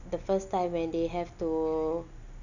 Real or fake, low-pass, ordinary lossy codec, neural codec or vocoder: real; 7.2 kHz; none; none